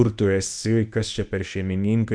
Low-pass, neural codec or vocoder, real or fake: 9.9 kHz; codec, 24 kHz, 0.9 kbps, WavTokenizer, medium speech release version 1; fake